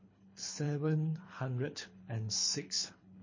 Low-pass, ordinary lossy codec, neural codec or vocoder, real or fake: 7.2 kHz; MP3, 32 kbps; codec, 24 kHz, 3 kbps, HILCodec; fake